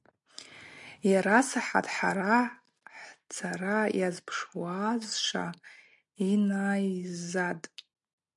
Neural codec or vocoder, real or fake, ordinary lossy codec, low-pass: none; real; MP3, 64 kbps; 10.8 kHz